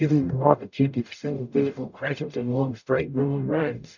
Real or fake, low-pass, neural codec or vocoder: fake; 7.2 kHz; codec, 44.1 kHz, 0.9 kbps, DAC